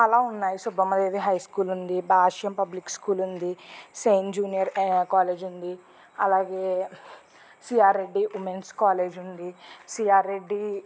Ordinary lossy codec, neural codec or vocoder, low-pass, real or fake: none; none; none; real